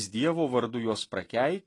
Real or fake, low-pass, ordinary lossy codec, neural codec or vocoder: real; 10.8 kHz; AAC, 32 kbps; none